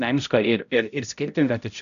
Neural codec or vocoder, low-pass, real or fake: codec, 16 kHz, 0.5 kbps, X-Codec, HuBERT features, trained on balanced general audio; 7.2 kHz; fake